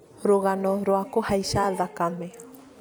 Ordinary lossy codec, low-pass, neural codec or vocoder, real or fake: none; none; vocoder, 44.1 kHz, 128 mel bands every 256 samples, BigVGAN v2; fake